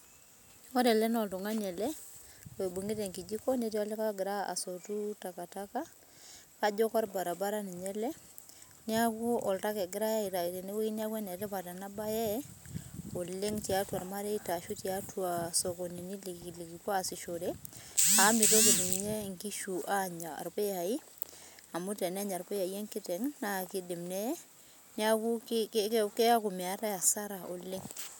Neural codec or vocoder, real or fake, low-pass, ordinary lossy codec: none; real; none; none